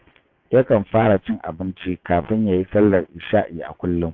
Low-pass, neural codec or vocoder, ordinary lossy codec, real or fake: 7.2 kHz; vocoder, 22.05 kHz, 80 mel bands, WaveNeXt; AAC, 48 kbps; fake